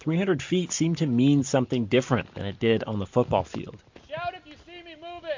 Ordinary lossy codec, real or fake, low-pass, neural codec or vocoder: MP3, 48 kbps; real; 7.2 kHz; none